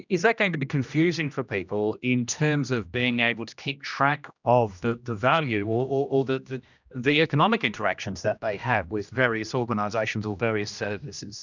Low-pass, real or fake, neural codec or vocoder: 7.2 kHz; fake; codec, 16 kHz, 1 kbps, X-Codec, HuBERT features, trained on general audio